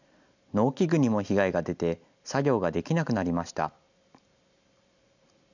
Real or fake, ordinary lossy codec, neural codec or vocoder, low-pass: real; none; none; 7.2 kHz